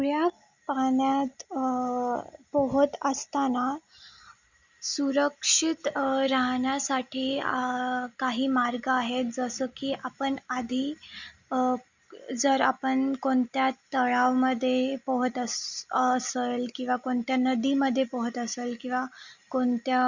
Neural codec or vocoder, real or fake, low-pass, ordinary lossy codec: none; real; 7.2 kHz; Opus, 64 kbps